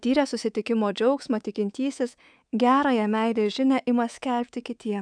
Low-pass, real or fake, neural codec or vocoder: 9.9 kHz; fake; codec, 24 kHz, 3.1 kbps, DualCodec